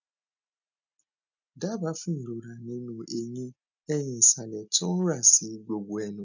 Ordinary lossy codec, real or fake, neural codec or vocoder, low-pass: none; real; none; 7.2 kHz